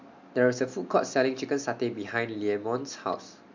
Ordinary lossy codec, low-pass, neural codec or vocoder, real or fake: MP3, 64 kbps; 7.2 kHz; none; real